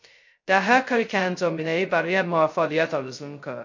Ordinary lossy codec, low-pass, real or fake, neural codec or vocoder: MP3, 48 kbps; 7.2 kHz; fake; codec, 16 kHz, 0.2 kbps, FocalCodec